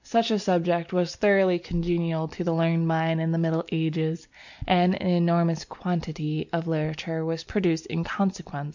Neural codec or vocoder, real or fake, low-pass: none; real; 7.2 kHz